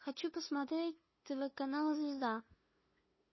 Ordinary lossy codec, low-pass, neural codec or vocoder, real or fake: MP3, 24 kbps; 7.2 kHz; codec, 16 kHz, 2 kbps, FunCodec, trained on LibriTTS, 25 frames a second; fake